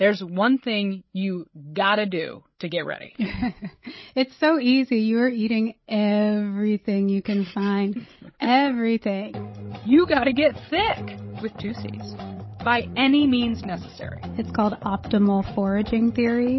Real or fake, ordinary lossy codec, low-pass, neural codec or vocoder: fake; MP3, 24 kbps; 7.2 kHz; codec, 16 kHz, 16 kbps, FreqCodec, larger model